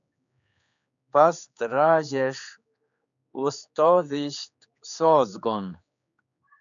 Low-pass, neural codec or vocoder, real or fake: 7.2 kHz; codec, 16 kHz, 4 kbps, X-Codec, HuBERT features, trained on general audio; fake